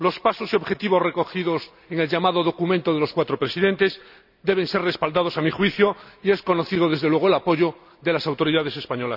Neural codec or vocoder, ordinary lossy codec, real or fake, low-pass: none; none; real; 5.4 kHz